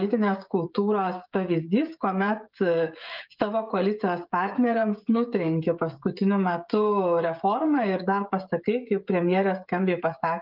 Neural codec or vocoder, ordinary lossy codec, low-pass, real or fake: codec, 16 kHz, 16 kbps, FreqCodec, smaller model; Opus, 24 kbps; 5.4 kHz; fake